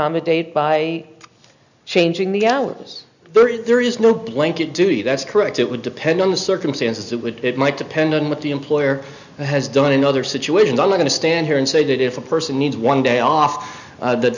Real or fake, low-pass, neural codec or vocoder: real; 7.2 kHz; none